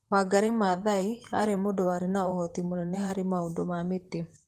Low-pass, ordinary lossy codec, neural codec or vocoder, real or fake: 14.4 kHz; Opus, 24 kbps; vocoder, 44.1 kHz, 128 mel bands, Pupu-Vocoder; fake